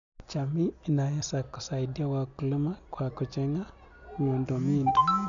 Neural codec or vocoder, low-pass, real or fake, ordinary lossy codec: none; 7.2 kHz; real; none